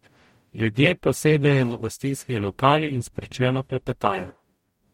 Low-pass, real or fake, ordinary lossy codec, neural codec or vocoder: 19.8 kHz; fake; MP3, 64 kbps; codec, 44.1 kHz, 0.9 kbps, DAC